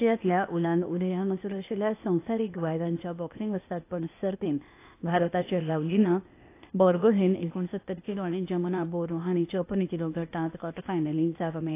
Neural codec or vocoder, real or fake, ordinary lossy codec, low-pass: codec, 16 kHz, 0.8 kbps, ZipCodec; fake; AAC, 24 kbps; 3.6 kHz